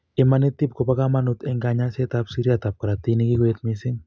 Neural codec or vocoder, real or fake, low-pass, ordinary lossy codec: none; real; none; none